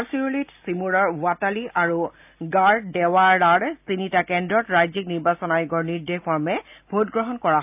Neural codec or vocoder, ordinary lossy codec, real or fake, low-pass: none; AAC, 32 kbps; real; 3.6 kHz